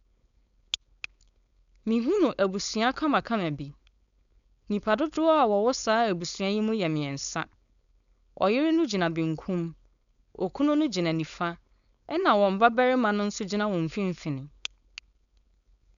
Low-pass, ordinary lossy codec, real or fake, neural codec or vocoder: 7.2 kHz; none; fake; codec, 16 kHz, 4.8 kbps, FACodec